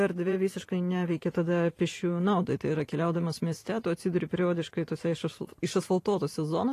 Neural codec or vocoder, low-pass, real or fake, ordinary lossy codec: vocoder, 44.1 kHz, 128 mel bands every 512 samples, BigVGAN v2; 14.4 kHz; fake; AAC, 48 kbps